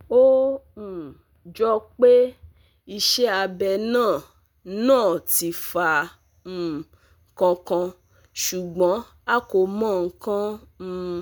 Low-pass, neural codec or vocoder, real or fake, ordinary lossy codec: none; none; real; none